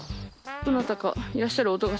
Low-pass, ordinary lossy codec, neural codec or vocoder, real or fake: none; none; none; real